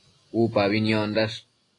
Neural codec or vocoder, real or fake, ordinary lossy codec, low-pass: none; real; AAC, 32 kbps; 10.8 kHz